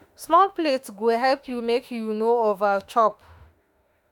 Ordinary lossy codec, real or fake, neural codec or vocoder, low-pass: none; fake; autoencoder, 48 kHz, 32 numbers a frame, DAC-VAE, trained on Japanese speech; 19.8 kHz